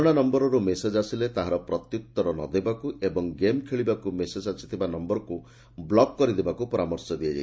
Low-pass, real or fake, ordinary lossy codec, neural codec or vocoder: none; real; none; none